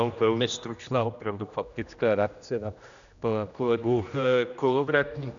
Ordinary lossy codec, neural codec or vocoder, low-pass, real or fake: MP3, 96 kbps; codec, 16 kHz, 1 kbps, X-Codec, HuBERT features, trained on general audio; 7.2 kHz; fake